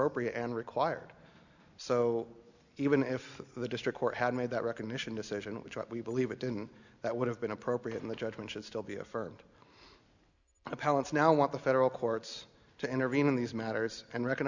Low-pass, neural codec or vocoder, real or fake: 7.2 kHz; none; real